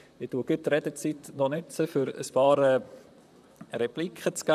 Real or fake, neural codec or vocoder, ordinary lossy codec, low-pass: fake; vocoder, 44.1 kHz, 128 mel bands, Pupu-Vocoder; none; 14.4 kHz